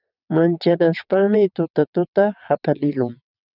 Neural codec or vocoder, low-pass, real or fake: vocoder, 22.05 kHz, 80 mel bands, WaveNeXt; 5.4 kHz; fake